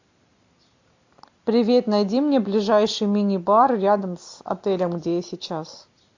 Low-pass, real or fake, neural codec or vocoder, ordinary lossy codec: 7.2 kHz; real; none; AAC, 48 kbps